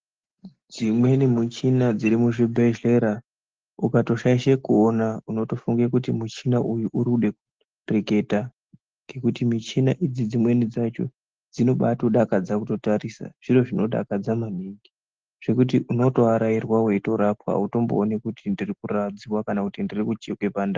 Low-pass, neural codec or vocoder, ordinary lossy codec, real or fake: 7.2 kHz; none; Opus, 16 kbps; real